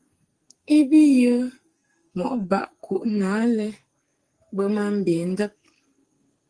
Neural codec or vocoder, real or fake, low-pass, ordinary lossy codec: codec, 44.1 kHz, 2.6 kbps, SNAC; fake; 9.9 kHz; Opus, 24 kbps